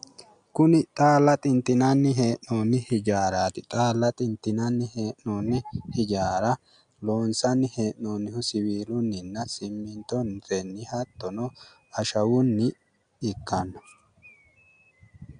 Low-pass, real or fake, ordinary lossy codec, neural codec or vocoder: 9.9 kHz; real; MP3, 96 kbps; none